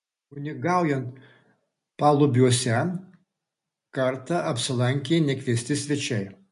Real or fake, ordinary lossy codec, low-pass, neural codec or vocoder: real; MP3, 64 kbps; 10.8 kHz; none